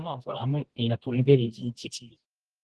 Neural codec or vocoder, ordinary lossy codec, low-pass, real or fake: codec, 24 kHz, 0.9 kbps, WavTokenizer, medium music audio release; Opus, 16 kbps; 10.8 kHz; fake